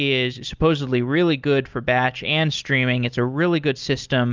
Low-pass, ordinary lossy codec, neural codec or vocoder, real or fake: 7.2 kHz; Opus, 24 kbps; none; real